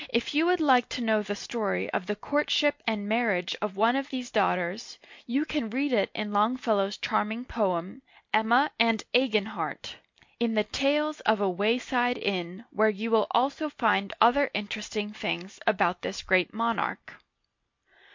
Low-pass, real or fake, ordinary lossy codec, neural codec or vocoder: 7.2 kHz; real; MP3, 48 kbps; none